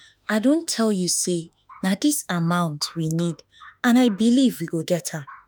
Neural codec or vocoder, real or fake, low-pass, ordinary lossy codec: autoencoder, 48 kHz, 32 numbers a frame, DAC-VAE, trained on Japanese speech; fake; none; none